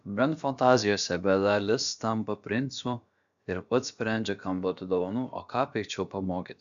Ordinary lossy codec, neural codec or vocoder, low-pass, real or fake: AAC, 96 kbps; codec, 16 kHz, about 1 kbps, DyCAST, with the encoder's durations; 7.2 kHz; fake